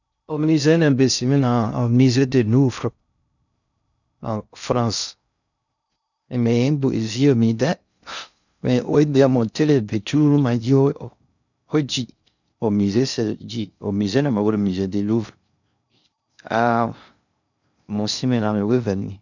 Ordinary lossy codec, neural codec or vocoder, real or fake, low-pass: none; codec, 16 kHz in and 24 kHz out, 0.6 kbps, FocalCodec, streaming, 2048 codes; fake; 7.2 kHz